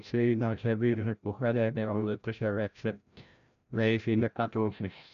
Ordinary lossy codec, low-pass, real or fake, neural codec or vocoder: none; 7.2 kHz; fake; codec, 16 kHz, 0.5 kbps, FreqCodec, larger model